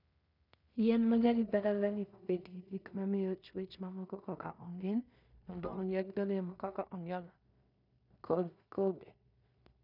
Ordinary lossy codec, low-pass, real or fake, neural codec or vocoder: none; 5.4 kHz; fake; codec, 16 kHz in and 24 kHz out, 0.4 kbps, LongCat-Audio-Codec, two codebook decoder